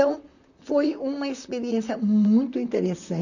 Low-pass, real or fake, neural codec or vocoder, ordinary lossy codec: 7.2 kHz; fake; vocoder, 44.1 kHz, 128 mel bands, Pupu-Vocoder; none